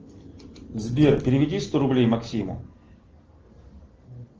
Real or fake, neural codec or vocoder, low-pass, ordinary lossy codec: real; none; 7.2 kHz; Opus, 16 kbps